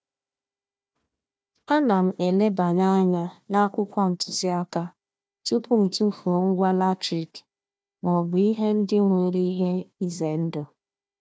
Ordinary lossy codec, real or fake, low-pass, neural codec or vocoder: none; fake; none; codec, 16 kHz, 1 kbps, FunCodec, trained on Chinese and English, 50 frames a second